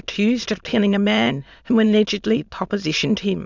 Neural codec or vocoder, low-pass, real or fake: autoencoder, 22.05 kHz, a latent of 192 numbers a frame, VITS, trained on many speakers; 7.2 kHz; fake